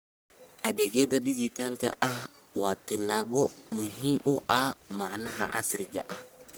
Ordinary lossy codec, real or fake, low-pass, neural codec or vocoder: none; fake; none; codec, 44.1 kHz, 1.7 kbps, Pupu-Codec